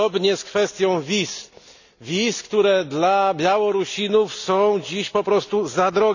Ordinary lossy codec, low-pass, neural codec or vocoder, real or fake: none; 7.2 kHz; none; real